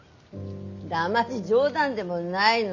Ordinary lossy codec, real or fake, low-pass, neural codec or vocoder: none; real; 7.2 kHz; none